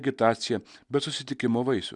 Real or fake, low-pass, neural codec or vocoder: real; 10.8 kHz; none